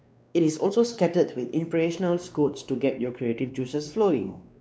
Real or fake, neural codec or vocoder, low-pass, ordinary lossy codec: fake; codec, 16 kHz, 2 kbps, X-Codec, WavLM features, trained on Multilingual LibriSpeech; none; none